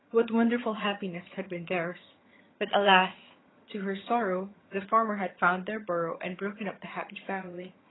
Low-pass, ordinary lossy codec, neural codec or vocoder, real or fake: 7.2 kHz; AAC, 16 kbps; vocoder, 22.05 kHz, 80 mel bands, HiFi-GAN; fake